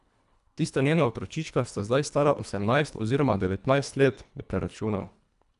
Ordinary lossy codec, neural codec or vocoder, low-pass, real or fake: none; codec, 24 kHz, 1.5 kbps, HILCodec; 10.8 kHz; fake